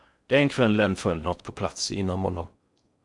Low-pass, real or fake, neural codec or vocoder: 10.8 kHz; fake; codec, 16 kHz in and 24 kHz out, 0.6 kbps, FocalCodec, streaming, 4096 codes